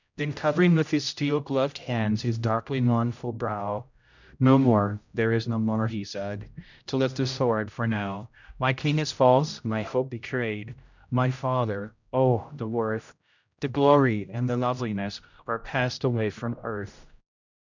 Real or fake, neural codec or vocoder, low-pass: fake; codec, 16 kHz, 0.5 kbps, X-Codec, HuBERT features, trained on general audio; 7.2 kHz